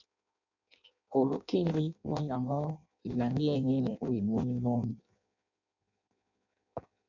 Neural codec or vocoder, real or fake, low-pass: codec, 16 kHz in and 24 kHz out, 0.6 kbps, FireRedTTS-2 codec; fake; 7.2 kHz